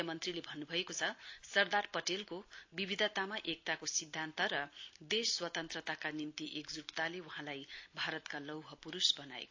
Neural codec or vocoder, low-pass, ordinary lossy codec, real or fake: none; 7.2 kHz; AAC, 48 kbps; real